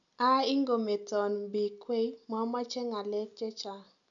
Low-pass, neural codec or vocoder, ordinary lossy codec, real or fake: 7.2 kHz; none; none; real